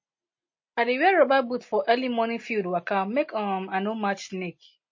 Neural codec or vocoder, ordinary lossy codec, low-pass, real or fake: none; MP3, 32 kbps; 7.2 kHz; real